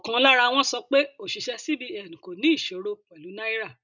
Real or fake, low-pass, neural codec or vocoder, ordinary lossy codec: real; 7.2 kHz; none; none